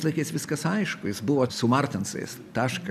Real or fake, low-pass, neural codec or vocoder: real; 14.4 kHz; none